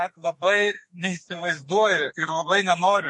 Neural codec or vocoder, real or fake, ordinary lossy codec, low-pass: codec, 32 kHz, 1.9 kbps, SNAC; fake; MP3, 48 kbps; 10.8 kHz